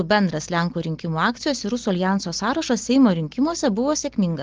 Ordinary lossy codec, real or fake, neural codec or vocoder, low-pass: Opus, 16 kbps; real; none; 7.2 kHz